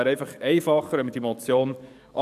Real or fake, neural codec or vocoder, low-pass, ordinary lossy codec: fake; codec, 44.1 kHz, 7.8 kbps, DAC; 14.4 kHz; none